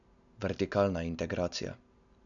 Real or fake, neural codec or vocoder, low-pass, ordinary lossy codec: real; none; 7.2 kHz; none